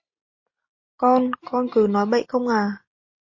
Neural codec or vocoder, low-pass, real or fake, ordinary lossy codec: none; 7.2 kHz; real; MP3, 32 kbps